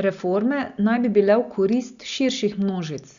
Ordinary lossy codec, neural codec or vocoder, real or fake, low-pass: Opus, 64 kbps; none; real; 7.2 kHz